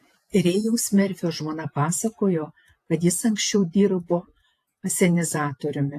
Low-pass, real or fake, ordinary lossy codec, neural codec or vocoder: 14.4 kHz; fake; AAC, 64 kbps; vocoder, 48 kHz, 128 mel bands, Vocos